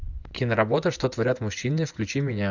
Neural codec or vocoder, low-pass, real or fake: vocoder, 24 kHz, 100 mel bands, Vocos; 7.2 kHz; fake